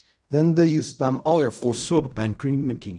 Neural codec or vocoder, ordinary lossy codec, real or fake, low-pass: codec, 16 kHz in and 24 kHz out, 0.4 kbps, LongCat-Audio-Codec, fine tuned four codebook decoder; none; fake; 10.8 kHz